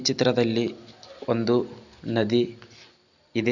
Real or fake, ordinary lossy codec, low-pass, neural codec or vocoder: real; none; 7.2 kHz; none